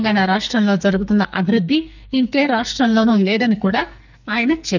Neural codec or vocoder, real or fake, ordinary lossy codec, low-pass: codec, 44.1 kHz, 2.6 kbps, SNAC; fake; none; 7.2 kHz